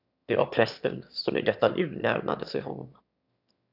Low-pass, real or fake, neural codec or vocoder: 5.4 kHz; fake; autoencoder, 22.05 kHz, a latent of 192 numbers a frame, VITS, trained on one speaker